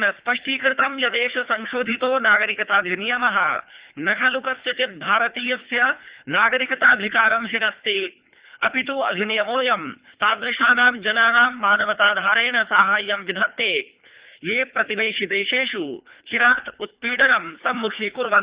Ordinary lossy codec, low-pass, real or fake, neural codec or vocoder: Opus, 64 kbps; 3.6 kHz; fake; codec, 24 kHz, 3 kbps, HILCodec